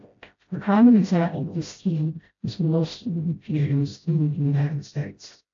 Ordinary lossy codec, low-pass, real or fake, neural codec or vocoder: AAC, 48 kbps; 7.2 kHz; fake; codec, 16 kHz, 0.5 kbps, FreqCodec, smaller model